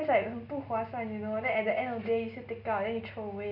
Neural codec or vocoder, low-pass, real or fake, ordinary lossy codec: none; 5.4 kHz; real; none